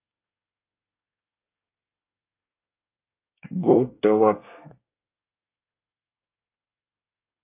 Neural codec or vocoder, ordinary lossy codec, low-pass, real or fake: codec, 24 kHz, 1 kbps, SNAC; AAC, 24 kbps; 3.6 kHz; fake